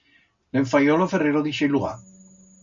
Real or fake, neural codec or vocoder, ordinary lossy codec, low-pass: real; none; MP3, 96 kbps; 7.2 kHz